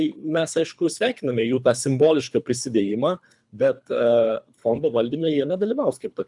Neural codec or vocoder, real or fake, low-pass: codec, 24 kHz, 3 kbps, HILCodec; fake; 10.8 kHz